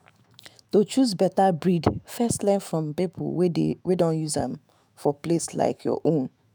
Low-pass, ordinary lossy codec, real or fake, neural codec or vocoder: none; none; fake; autoencoder, 48 kHz, 128 numbers a frame, DAC-VAE, trained on Japanese speech